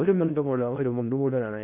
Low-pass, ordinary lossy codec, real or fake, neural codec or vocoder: 3.6 kHz; none; fake; codec, 16 kHz in and 24 kHz out, 0.6 kbps, FocalCodec, streaming, 2048 codes